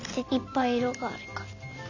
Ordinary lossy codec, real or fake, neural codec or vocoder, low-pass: none; real; none; 7.2 kHz